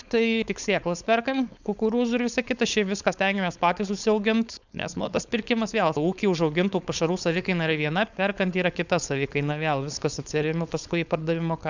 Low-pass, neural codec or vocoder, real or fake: 7.2 kHz; codec, 16 kHz, 4.8 kbps, FACodec; fake